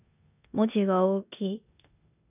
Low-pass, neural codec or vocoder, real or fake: 3.6 kHz; codec, 16 kHz in and 24 kHz out, 0.9 kbps, LongCat-Audio-Codec, four codebook decoder; fake